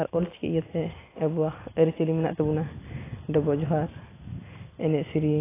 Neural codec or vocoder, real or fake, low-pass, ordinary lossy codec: none; real; 3.6 kHz; AAC, 16 kbps